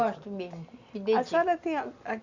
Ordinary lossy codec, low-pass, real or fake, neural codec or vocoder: Opus, 64 kbps; 7.2 kHz; real; none